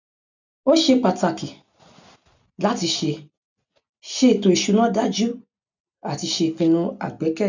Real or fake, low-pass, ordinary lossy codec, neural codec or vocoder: real; 7.2 kHz; none; none